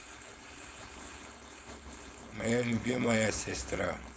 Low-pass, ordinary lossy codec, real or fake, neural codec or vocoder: none; none; fake; codec, 16 kHz, 4.8 kbps, FACodec